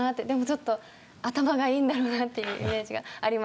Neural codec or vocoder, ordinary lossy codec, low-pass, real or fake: none; none; none; real